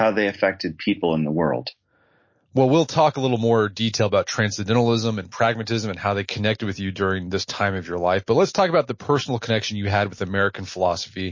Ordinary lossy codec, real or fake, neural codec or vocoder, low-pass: MP3, 32 kbps; real; none; 7.2 kHz